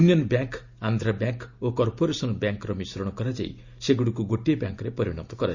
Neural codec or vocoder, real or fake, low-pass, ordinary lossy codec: none; real; 7.2 kHz; Opus, 64 kbps